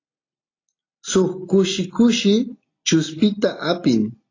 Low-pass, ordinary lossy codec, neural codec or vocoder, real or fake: 7.2 kHz; AAC, 32 kbps; none; real